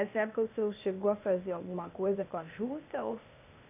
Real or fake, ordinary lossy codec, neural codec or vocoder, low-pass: fake; none; codec, 16 kHz, 0.8 kbps, ZipCodec; 3.6 kHz